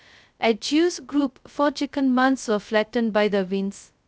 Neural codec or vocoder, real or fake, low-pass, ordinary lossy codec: codec, 16 kHz, 0.2 kbps, FocalCodec; fake; none; none